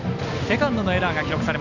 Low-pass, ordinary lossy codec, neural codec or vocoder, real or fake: 7.2 kHz; none; none; real